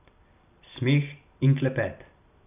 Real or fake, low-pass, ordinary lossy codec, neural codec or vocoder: real; 3.6 kHz; none; none